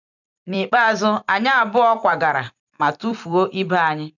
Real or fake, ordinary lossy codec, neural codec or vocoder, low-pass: fake; none; vocoder, 44.1 kHz, 128 mel bands every 256 samples, BigVGAN v2; 7.2 kHz